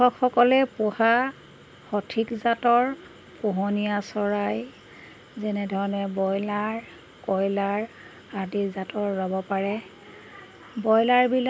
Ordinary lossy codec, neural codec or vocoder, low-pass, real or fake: none; none; none; real